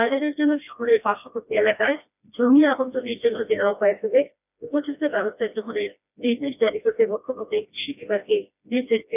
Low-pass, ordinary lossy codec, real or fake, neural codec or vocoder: 3.6 kHz; none; fake; codec, 16 kHz, 1 kbps, FreqCodec, smaller model